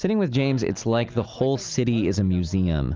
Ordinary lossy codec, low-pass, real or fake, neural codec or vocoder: Opus, 32 kbps; 7.2 kHz; real; none